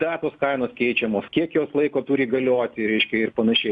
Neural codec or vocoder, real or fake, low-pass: none; real; 10.8 kHz